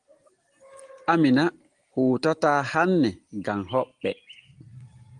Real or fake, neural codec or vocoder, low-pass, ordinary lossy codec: real; none; 9.9 kHz; Opus, 24 kbps